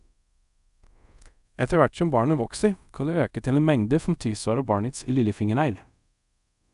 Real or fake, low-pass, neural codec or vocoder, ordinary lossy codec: fake; 10.8 kHz; codec, 24 kHz, 0.5 kbps, DualCodec; none